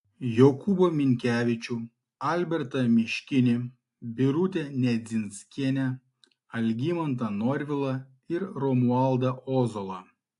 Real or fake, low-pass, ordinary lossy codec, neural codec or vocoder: real; 10.8 kHz; AAC, 64 kbps; none